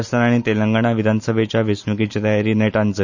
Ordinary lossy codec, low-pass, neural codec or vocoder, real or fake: none; 7.2 kHz; none; real